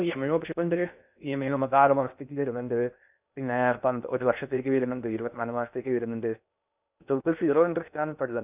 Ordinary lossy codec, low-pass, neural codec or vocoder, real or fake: none; 3.6 kHz; codec, 16 kHz in and 24 kHz out, 0.6 kbps, FocalCodec, streaming, 2048 codes; fake